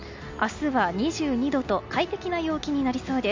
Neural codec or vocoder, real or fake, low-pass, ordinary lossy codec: none; real; 7.2 kHz; MP3, 64 kbps